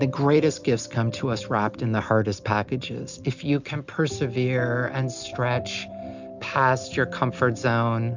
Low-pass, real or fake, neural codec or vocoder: 7.2 kHz; real; none